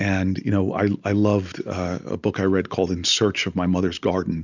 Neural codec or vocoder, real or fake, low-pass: none; real; 7.2 kHz